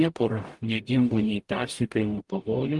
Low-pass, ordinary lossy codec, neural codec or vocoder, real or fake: 10.8 kHz; Opus, 24 kbps; codec, 44.1 kHz, 0.9 kbps, DAC; fake